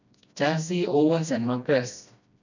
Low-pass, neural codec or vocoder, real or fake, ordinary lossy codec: 7.2 kHz; codec, 16 kHz, 1 kbps, FreqCodec, smaller model; fake; none